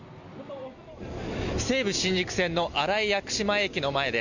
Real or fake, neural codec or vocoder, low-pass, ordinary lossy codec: real; none; 7.2 kHz; none